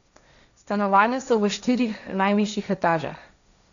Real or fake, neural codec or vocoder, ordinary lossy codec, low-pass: fake; codec, 16 kHz, 1.1 kbps, Voila-Tokenizer; none; 7.2 kHz